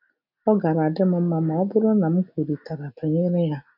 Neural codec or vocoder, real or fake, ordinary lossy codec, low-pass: none; real; none; 5.4 kHz